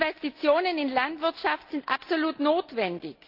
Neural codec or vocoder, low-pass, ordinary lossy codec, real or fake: none; 5.4 kHz; Opus, 32 kbps; real